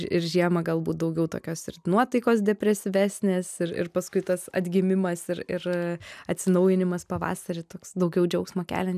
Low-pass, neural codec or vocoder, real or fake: 14.4 kHz; none; real